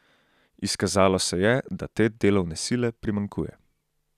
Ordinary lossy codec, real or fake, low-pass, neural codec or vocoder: none; real; 14.4 kHz; none